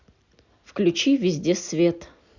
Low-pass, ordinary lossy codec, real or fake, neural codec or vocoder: 7.2 kHz; Opus, 64 kbps; real; none